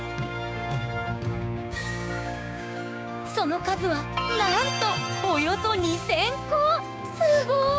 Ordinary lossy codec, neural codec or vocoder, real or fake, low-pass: none; codec, 16 kHz, 6 kbps, DAC; fake; none